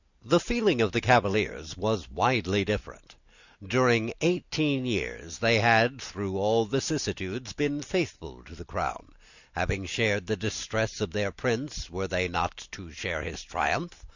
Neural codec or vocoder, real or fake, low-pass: none; real; 7.2 kHz